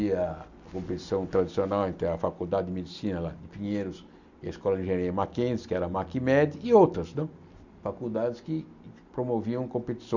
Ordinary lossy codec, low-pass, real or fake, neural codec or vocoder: none; 7.2 kHz; real; none